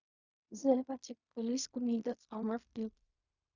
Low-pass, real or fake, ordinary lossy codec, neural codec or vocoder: 7.2 kHz; fake; Opus, 64 kbps; codec, 16 kHz in and 24 kHz out, 0.4 kbps, LongCat-Audio-Codec, fine tuned four codebook decoder